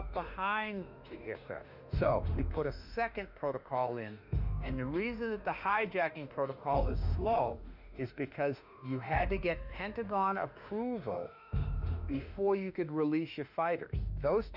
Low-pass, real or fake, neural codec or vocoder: 5.4 kHz; fake; autoencoder, 48 kHz, 32 numbers a frame, DAC-VAE, trained on Japanese speech